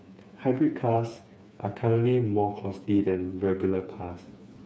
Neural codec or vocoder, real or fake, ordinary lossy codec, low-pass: codec, 16 kHz, 4 kbps, FreqCodec, smaller model; fake; none; none